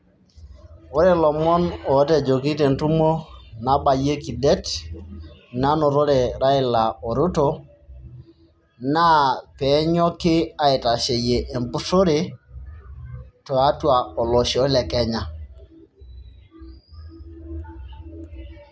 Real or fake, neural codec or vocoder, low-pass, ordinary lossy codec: real; none; none; none